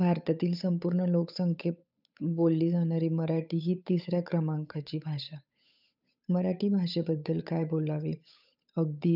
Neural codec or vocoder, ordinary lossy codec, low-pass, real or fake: codec, 16 kHz, 8 kbps, FunCodec, trained on Chinese and English, 25 frames a second; none; 5.4 kHz; fake